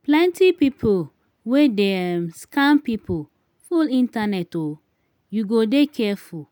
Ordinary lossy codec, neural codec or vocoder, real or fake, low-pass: none; none; real; 19.8 kHz